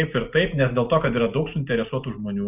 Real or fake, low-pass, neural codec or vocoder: real; 3.6 kHz; none